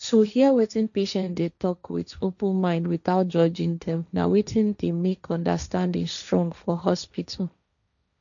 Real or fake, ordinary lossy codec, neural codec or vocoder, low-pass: fake; none; codec, 16 kHz, 1.1 kbps, Voila-Tokenizer; 7.2 kHz